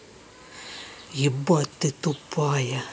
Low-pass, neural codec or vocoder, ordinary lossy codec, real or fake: none; none; none; real